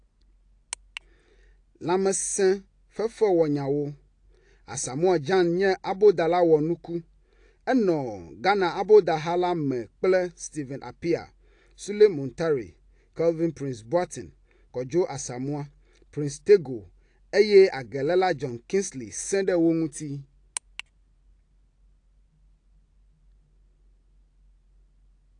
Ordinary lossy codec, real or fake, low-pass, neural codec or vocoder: AAC, 48 kbps; real; 9.9 kHz; none